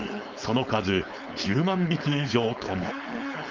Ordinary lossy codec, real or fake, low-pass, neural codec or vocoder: Opus, 24 kbps; fake; 7.2 kHz; codec, 16 kHz, 4.8 kbps, FACodec